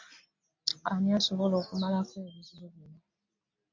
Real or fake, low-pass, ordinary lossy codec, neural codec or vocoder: real; 7.2 kHz; MP3, 48 kbps; none